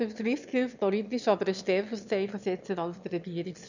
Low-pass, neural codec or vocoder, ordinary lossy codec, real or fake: 7.2 kHz; autoencoder, 22.05 kHz, a latent of 192 numbers a frame, VITS, trained on one speaker; none; fake